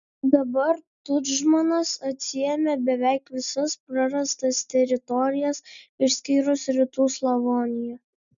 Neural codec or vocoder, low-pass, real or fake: none; 7.2 kHz; real